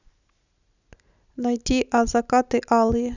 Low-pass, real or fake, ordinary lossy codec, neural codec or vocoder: 7.2 kHz; real; none; none